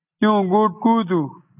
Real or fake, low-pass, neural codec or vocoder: real; 3.6 kHz; none